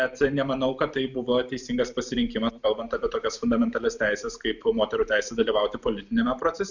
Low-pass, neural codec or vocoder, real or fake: 7.2 kHz; none; real